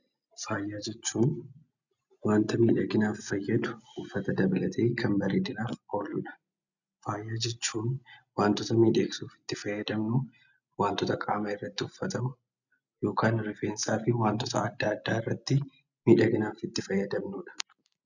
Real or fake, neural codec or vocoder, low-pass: real; none; 7.2 kHz